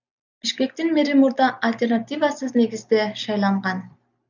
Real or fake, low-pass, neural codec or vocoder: real; 7.2 kHz; none